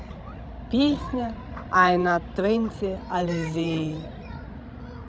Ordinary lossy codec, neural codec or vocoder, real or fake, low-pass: none; codec, 16 kHz, 16 kbps, FreqCodec, larger model; fake; none